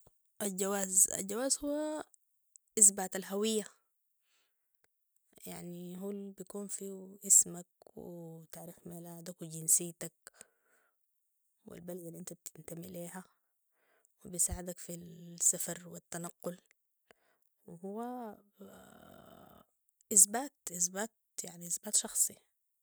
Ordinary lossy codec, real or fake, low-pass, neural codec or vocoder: none; real; none; none